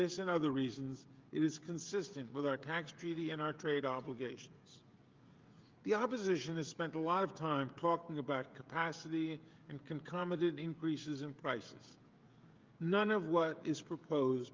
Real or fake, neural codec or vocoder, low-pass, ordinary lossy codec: fake; codec, 16 kHz, 16 kbps, FreqCodec, smaller model; 7.2 kHz; Opus, 32 kbps